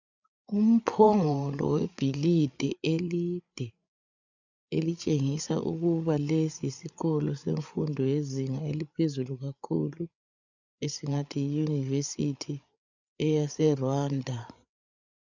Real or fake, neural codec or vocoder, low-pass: fake; codec, 16 kHz, 8 kbps, FreqCodec, larger model; 7.2 kHz